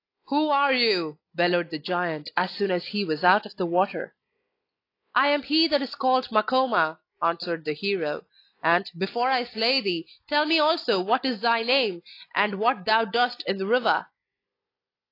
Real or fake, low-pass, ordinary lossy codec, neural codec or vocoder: real; 5.4 kHz; AAC, 32 kbps; none